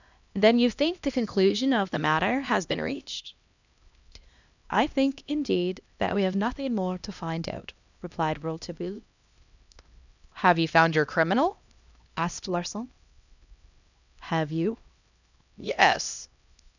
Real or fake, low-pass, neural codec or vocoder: fake; 7.2 kHz; codec, 16 kHz, 1 kbps, X-Codec, HuBERT features, trained on LibriSpeech